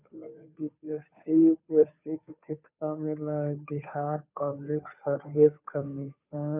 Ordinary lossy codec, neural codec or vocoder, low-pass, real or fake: none; codec, 16 kHz, 2 kbps, FunCodec, trained on Chinese and English, 25 frames a second; 3.6 kHz; fake